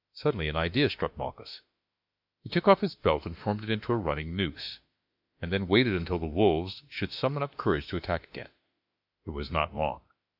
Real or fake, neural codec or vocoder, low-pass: fake; autoencoder, 48 kHz, 32 numbers a frame, DAC-VAE, trained on Japanese speech; 5.4 kHz